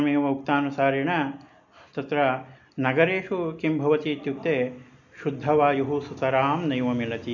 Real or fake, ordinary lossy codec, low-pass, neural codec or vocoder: real; none; 7.2 kHz; none